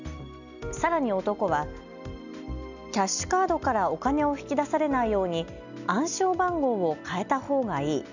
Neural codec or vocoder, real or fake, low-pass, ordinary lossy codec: none; real; 7.2 kHz; none